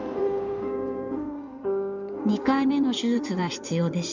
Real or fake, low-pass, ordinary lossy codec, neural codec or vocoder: fake; 7.2 kHz; none; codec, 16 kHz in and 24 kHz out, 2.2 kbps, FireRedTTS-2 codec